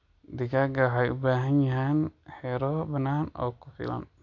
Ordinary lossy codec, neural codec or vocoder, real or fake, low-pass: none; none; real; 7.2 kHz